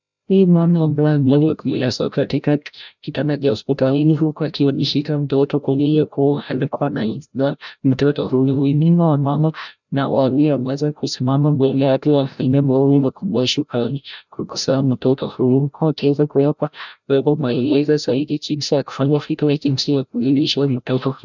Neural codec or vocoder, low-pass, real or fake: codec, 16 kHz, 0.5 kbps, FreqCodec, larger model; 7.2 kHz; fake